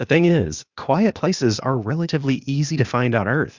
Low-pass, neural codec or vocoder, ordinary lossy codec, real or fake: 7.2 kHz; codec, 16 kHz, 0.8 kbps, ZipCodec; Opus, 64 kbps; fake